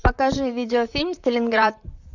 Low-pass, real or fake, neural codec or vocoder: 7.2 kHz; fake; vocoder, 44.1 kHz, 128 mel bands, Pupu-Vocoder